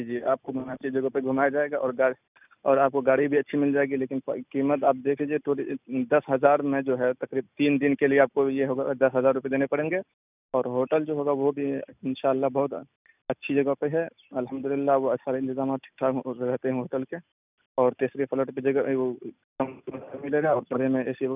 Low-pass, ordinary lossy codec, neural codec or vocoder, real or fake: 3.6 kHz; none; none; real